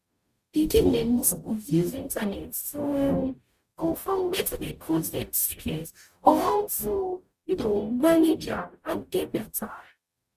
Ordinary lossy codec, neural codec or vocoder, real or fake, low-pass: Opus, 64 kbps; codec, 44.1 kHz, 0.9 kbps, DAC; fake; 14.4 kHz